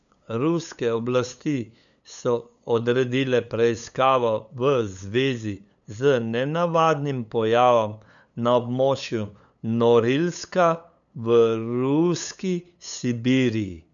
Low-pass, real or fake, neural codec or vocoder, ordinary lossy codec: 7.2 kHz; fake; codec, 16 kHz, 8 kbps, FunCodec, trained on LibriTTS, 25 frames a second; none